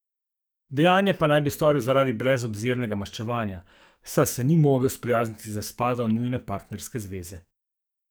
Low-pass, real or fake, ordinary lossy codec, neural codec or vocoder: none; fake; none; codec, 44.1 kHz, 2.6 kbps, SNAC